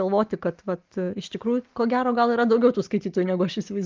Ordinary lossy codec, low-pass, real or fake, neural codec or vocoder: Opus, 32 kbps; 7.2 kHz; real; none